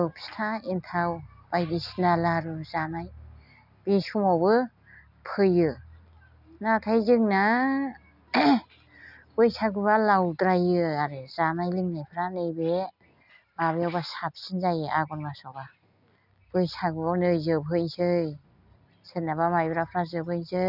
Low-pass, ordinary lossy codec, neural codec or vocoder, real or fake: 5.4 kHz; none; none; real